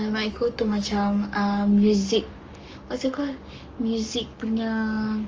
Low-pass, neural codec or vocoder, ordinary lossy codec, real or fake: 7.2 kHz; codec, 44.1 kHz, 7.8 kbps, Pupu-Codec; Opus, 24 kbps; fake